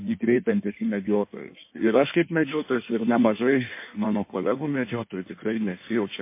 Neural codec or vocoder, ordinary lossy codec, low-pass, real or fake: codec, 16 kHz in and 24 kHz out, 1.1 kbps, FireRedTTS-2 codec; MP3, 24 kbps; 3.6 kHz; fake